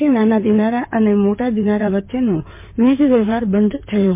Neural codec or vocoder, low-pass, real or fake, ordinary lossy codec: codec, 16 kHz in and 24 kHz out, 2.2 kbps, FireRedTTS-2 codec; 3.6 kHz; fake; MP3, 24 kbps